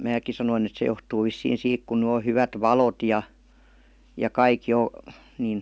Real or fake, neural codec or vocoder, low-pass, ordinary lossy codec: real; none; none; none